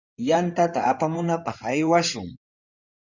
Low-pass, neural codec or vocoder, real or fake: 7.2 kHz; codec, 16 kHz in and 24 kHz out, 2.2 kbps, FireRedTTS-2 codec; fake